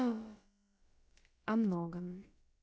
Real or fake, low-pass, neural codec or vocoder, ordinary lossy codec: fake; none; codec, 16 kHz, about 1 kbps, DyCAST, with the encoder's durations; none